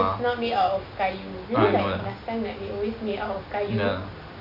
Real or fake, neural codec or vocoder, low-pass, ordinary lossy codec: real; none; 5.4 kHz; none